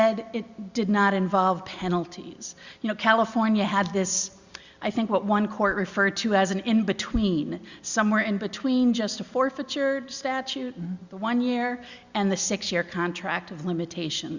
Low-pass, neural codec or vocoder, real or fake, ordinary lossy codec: 7.2 kHz; none; real; Opus, 64 kbps